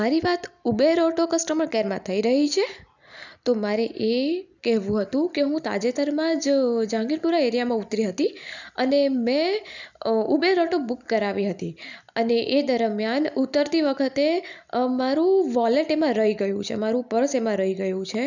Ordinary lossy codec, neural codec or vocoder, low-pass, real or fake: none; none; 7.2 kHz; real